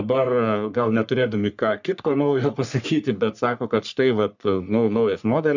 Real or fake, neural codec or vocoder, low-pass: fake; codec, 44.1 kHz, 3.4 kbps, Pupu-Codec; 7.2 kHz